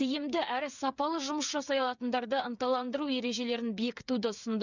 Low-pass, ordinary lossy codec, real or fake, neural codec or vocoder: 7.2 kHz; none; fake; codec, 16 kHz, 8 kbps, FreqCodec, smaller model